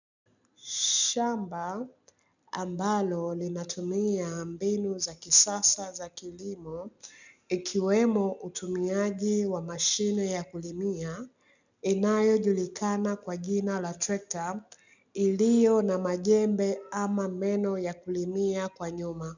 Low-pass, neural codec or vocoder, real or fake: 7.2 kHz; none; real